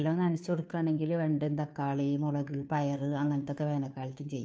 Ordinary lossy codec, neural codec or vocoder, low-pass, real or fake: none; codec, 16 kHz, 2 kbps, FunCodec, trained on Chinese and English, 25 frames a second; none; fake